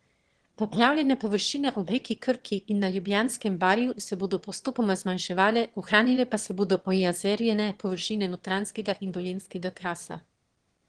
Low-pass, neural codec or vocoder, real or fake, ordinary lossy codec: 9.9 kHz; autoencoder, 22.05 kHz, a latent of 192 numbers a frame, VITS, trained on one speaker; fake; Opus, 16 kbps